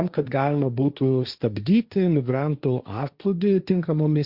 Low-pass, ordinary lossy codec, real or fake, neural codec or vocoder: 5.4 kHz; Opus, 64 kbps; fake; codec, 16 kHz, 1.1 kbps, Voila-Tokenizer